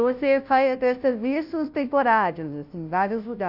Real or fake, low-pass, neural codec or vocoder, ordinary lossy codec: fake; 5.4 kHz; codec, 16 kHz, 0.5 kbps, FunCodec, trained on Chinese and English, 25 frames a second; none